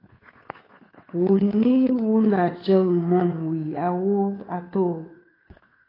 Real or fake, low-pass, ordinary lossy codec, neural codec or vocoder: fake; 5.4 kHz; AAC, 24 kbps; codec, 16 kHz, 2 kbps, FunCodec, trained on Chinese and English, 25 frames a second